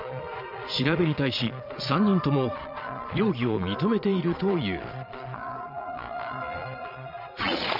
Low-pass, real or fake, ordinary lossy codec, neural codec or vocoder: 5.4 kHz; fake; none; vocoder, 22.05 kHz, 80 mel bands, Vocos